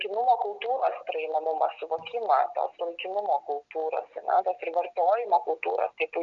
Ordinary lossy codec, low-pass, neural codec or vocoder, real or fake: Opus, 64 kbps; 7.2 kHz; none; real